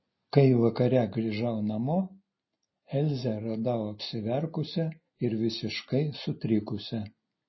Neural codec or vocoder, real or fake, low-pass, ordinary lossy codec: none; real; 7.2 kHz; MP3, 24 kbps